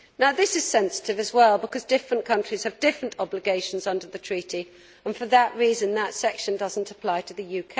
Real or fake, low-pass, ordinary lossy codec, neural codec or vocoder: real; none; none; none